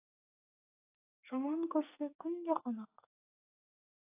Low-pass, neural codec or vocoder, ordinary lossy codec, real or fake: 3.6 kHz; codec, 44.1 kHz, 2.6 kbps, SNAC; AAC, 24 kbps; fake